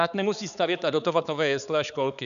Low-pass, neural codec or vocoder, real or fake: 7.2 kHz; codec, 16 kHz, 4 kbps, X-Codec, HuBERT features, trained on balanced general audio; fake